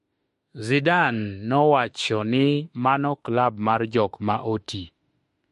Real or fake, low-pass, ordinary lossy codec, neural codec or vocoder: fake; 14.4 kHz; MP3, 48 kbps; autoencoder, 48 kHz, 32 numbers a frame, DAC-VAE, trained on Japanese speech